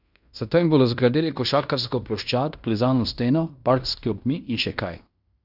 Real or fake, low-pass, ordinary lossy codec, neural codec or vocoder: fake; 5.4 kHz; none; codec, 16 kHz in and 24 kHz out, 0.9 kbps, LongCat-Audio-Codec, fine tuned four codebook decoder